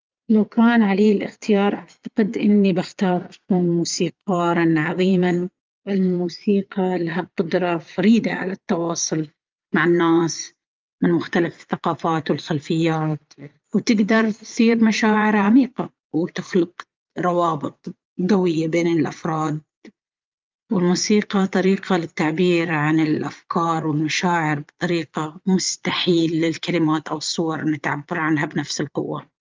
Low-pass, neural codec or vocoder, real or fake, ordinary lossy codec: 7.2 kHz; vocoder, 22.05 kHz, 80 mel bands, Vocos; fake; Opus, 32 kbps